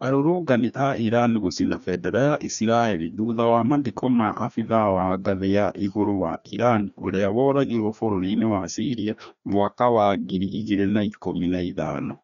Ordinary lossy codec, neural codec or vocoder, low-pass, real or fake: none; codec, 16 kHz, 1 kbps, FreqCodec, larger model; 7.2 kHz; fake